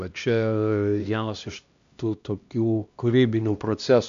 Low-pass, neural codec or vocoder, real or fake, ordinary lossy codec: 7.2 kHz; codec, 16 kHz, 1 kbps, X-Codec, HuBERT features, trained on LibriSpeech; fake; MP3, 64 kbps